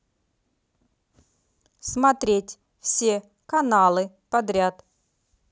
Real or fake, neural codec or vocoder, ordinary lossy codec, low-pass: real; none; none; none